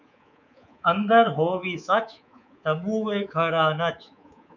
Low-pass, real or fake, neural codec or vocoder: 7.2 kHz; fake; codec, 24 kHz, 3.1 kbps, DualCodec